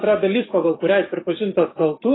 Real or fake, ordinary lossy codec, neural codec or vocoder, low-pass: fake; AAC, 16 kbps; codec, 44.1 kHz, 7.8 kbps, DAC; 7.2 kHz